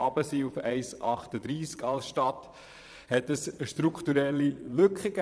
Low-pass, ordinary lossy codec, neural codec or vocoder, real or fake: none; none; vocoder, 22.05 kHz, 80 mel bands, Vocos; fake